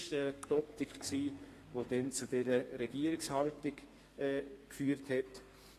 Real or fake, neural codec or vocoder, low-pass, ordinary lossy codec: fake; codec, 32 kHz, 1.9 kbps, SNAC; 14.4 kHz; AAC, 48 kbps